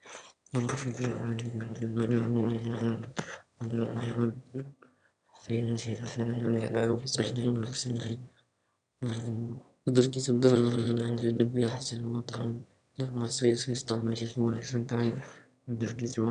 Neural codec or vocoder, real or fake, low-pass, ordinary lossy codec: autoencoder, 22.05 kHz, a latent of 192 numbers a frame, VITS, trained on one speaker; fake; 9.9 kHz; none